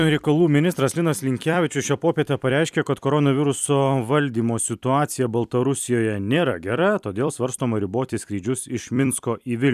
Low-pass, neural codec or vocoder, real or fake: 14.4 kHz; vocoder, 44.1 kHz, 128 mel bands every 256 samples, BigVGAN v2; fake